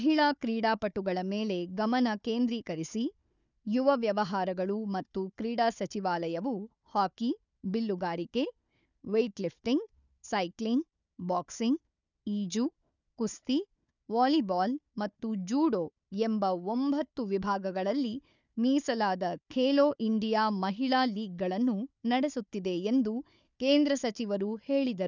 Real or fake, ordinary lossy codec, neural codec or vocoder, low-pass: fake; none; codec, 16 kHz, 4 kbps, FunCodec, trained on Chinese and English, 50 frames a second; 7.2 kHz